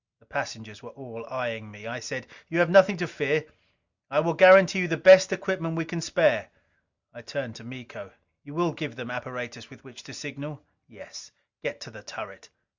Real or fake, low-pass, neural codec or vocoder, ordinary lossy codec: real; 7.2 kHz; none; Opus, 64 kbps